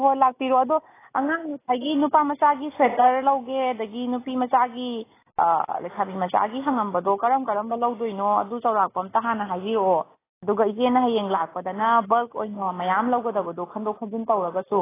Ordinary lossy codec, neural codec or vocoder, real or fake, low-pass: AAC, 16 kbps; none; real; 3.6 kHz